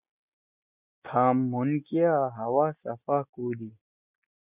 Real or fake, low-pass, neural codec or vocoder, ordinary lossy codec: real; 3.6 kHz; none; Opus, 64 kbps